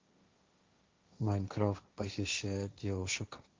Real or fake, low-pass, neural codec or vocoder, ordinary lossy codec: fake; 7.2 kHz; codec, 16 kHz, 1.1 kbps, Voila-Tokenizer; Opus, 32 kbps